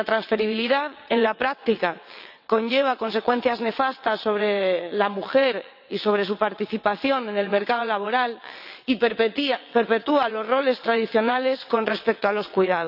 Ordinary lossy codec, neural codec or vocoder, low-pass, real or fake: none; vocoder, 22.05 kHz, 80 mel bands, WaveNeXt; 5.4 kHz; fake